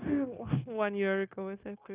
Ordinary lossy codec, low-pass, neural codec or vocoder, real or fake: Opus, 24 kbps; 3.6 kHz; codec, 16 kHz, 0.9 kbps, LongCat-Audio-Codec; fake